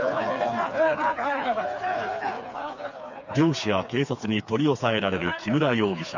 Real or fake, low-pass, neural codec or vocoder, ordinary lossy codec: fake; 7.2 kHz; codec, 16 kHz, 4 kbps, FreqCodec, smaller model; none